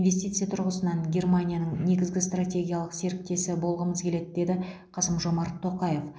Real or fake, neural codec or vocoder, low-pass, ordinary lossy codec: real; none; none; none